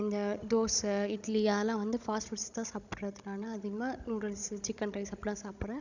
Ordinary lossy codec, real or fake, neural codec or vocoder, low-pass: none; fake; codec, 16 kHz, 16 kbps, FunCodec, trained on Chinese and English, 50 frames a second; 7.2 kHz